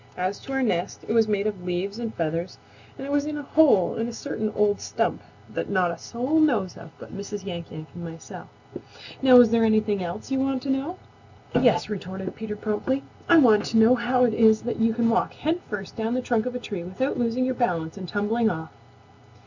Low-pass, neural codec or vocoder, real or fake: 7.2 kHz; none; real